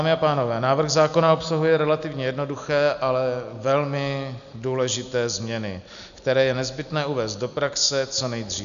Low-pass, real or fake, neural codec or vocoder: 7.2 kHz; real; none